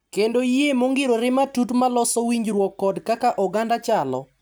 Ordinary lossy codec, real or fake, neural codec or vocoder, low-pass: none; real; none; none